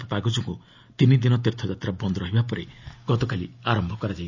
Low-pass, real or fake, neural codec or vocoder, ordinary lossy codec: 7.2 kHz; real; none; none